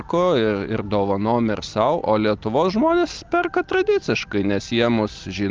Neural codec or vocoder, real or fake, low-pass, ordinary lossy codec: none; real; 7.2 kHz; Opus, 24 kbps